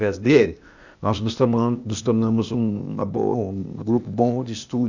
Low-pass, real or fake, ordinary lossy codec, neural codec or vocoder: 7.2 kHz; fake; none; codec, 16 kHz, 0.8 kbps, ZipCodec